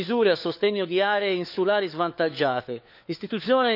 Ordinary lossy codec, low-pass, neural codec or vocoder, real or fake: none; 5.4 kHz; codec, 16 kHz, 4 kbps, FunCodec, trained on LibriTTS, 50 frames a second; fake